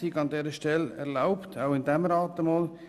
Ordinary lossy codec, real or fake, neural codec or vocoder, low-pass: none; real; none; 14.4 kHz